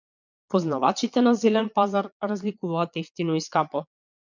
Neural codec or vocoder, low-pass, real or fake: vocoder, 22.05 kHz, 80 mel bands, Vocos; 7.2 kHz; fake